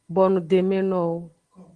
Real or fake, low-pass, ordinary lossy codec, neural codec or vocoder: real; 9.9 kHz; Opus, 16 kbps; none